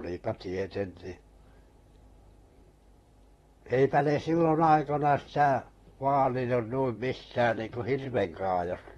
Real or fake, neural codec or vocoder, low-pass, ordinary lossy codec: fake; vocoder, 44.1 kHz, 128 mel bands, Pupu-Vocoder; 19.8 kHz; AAC, 32 kbps